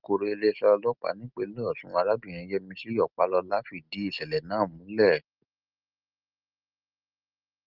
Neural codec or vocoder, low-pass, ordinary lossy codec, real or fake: none; 5.4 kHz; Opus, 32 kbps; real